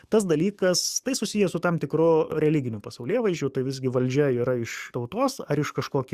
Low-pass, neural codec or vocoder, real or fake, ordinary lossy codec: 14.4 kHz; codec, 44.1 kHz, 7.8 kbps, DAC; fake; Opus, 64 kbps